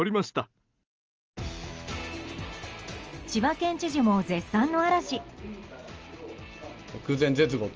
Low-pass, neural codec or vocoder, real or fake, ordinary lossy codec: 7.2 kHz; none; real; Opus, 24 kbps